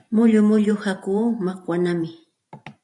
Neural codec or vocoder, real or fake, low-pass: vocoder, 44.1 kHz, 128 mel bands every 512 samples, BigVGAN v2; fake; 10.8 kHz